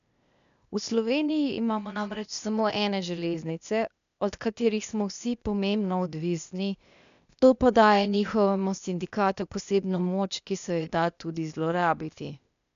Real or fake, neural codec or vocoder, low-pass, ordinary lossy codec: fake; codec, 16 kHz, 0.8 kbps, ZipCodec; 7.2 kHz; none